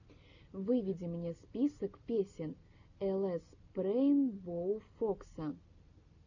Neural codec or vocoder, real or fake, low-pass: none; real; 7.2 kHz